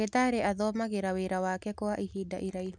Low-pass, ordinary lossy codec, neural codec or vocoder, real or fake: 9.9 kHz; none; none; real